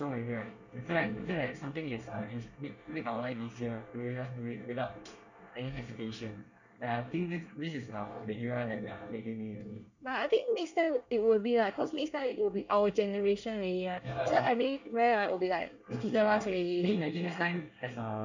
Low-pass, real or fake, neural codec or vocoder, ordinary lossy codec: 7.2 kHz; fake; codec, 24 kHz, 1 kbps, SNAC; none